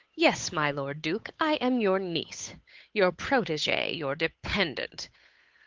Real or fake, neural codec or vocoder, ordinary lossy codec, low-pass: fake; codec, 16 kHz, 8 kbps, FunCodec, trained on Chinese and English, 25 frames a second; Opus, 32 kbps; 7.2 kHz